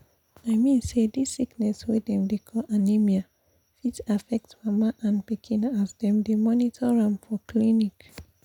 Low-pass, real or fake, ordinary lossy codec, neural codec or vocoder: 19.8 kHz; real; none; none